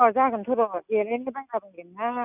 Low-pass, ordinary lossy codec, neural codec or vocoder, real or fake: 3.6 kHz; none; none; real